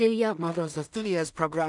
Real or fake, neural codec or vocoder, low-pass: fake; codec, 16 kHz in and 24 kHz out, 0.4 kbps, LongCat-Audio-Codec, two codebook decoder; 10.8 kHz